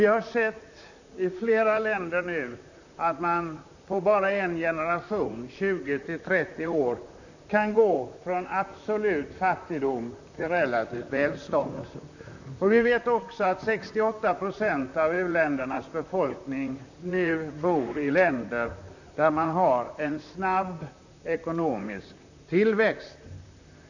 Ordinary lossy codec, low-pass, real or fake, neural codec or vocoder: none; 7.2 kHz; fake; vocoder, 44.1 kHz, 128 mel bands, Pupu-Vocoder